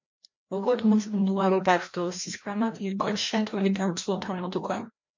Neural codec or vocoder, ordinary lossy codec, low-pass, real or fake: codec, 16 kHz, 1 kbps, FreqCodec, larger model; MP3, 48 kbps; 7.2 kHz; fake